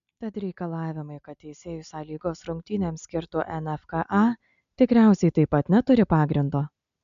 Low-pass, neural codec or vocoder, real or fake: 7.2 kHz; none; real